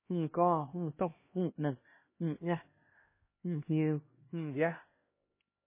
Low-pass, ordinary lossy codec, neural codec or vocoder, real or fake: 3.6 kHz; MP3, 16 kbps; codec, 16 kHz, 1 kbps, X-Codec, HuBERT features, trained on LibriSpeech; fake